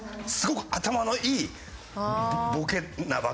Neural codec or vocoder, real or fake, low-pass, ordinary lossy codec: none; real; none; none